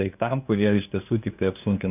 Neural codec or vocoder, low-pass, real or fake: codec, 16 kHz, 2 kbps, FunCodec, trained on LibriTTS, 25 frames a second; 3.6 kHz; fake